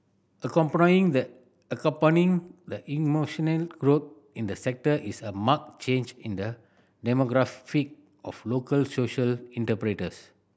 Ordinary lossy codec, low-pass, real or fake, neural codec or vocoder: none; none; real; none